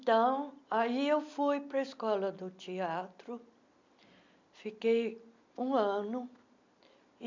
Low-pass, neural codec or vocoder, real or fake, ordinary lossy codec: 7.2 kHz; none; real; MP3, 64 kbps